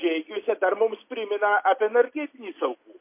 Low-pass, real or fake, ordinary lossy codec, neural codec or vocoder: 3.6 kHz; real; MP3, 24 kbps; none